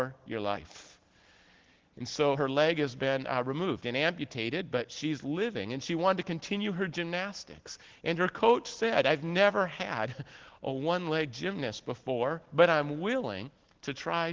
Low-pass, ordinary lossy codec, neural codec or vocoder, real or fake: 7.2 kHz; Opus, 16 kbps; none; real